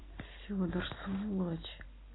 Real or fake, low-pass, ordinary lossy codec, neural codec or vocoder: real; 7.2 kHz; AAC, 16 kbps; none